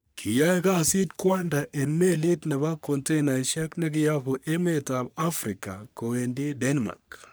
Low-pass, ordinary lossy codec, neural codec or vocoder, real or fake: none; none; codec, 44.1 kHz, 3.4 kbps, Pupu-Codec; fake